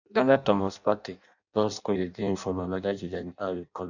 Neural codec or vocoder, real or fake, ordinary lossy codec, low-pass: codec, 16 kHz in and 24 kHz out, 0.6 kbps, FireRedTTS-2 codec; fake; none; 7.2 kHz